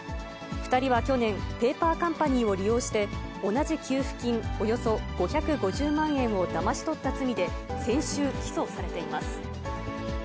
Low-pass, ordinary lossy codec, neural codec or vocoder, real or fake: none; none; none; real